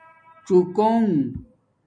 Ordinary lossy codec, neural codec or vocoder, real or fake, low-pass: MP3, 48 kbps; none; real; 9.9 kHz